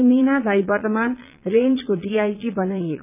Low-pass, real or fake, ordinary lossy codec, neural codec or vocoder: 3.6 kHz; fake; none; vocoder, 22.05 kHz, 80 mel bands, Vocos